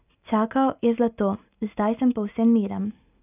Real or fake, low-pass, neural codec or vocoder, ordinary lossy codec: real; 3.6 kHz; none; none